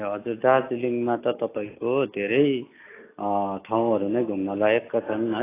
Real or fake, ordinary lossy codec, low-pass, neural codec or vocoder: real; AAC, 24 kbps; 3.6 kHz; none